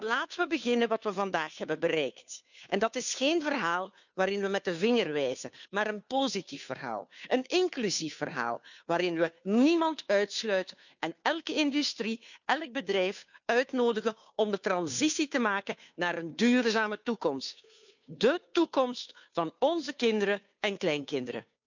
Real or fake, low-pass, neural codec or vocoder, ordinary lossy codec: fake; 7.2 kHz; codec, 16 kHz, 2 kbps, FunCodec, trained on Chinese and English, 25 frames a second; none